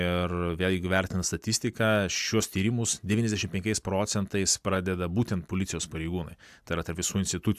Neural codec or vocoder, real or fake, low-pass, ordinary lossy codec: none; real; 14.4 kHz; AAC, 96 kbps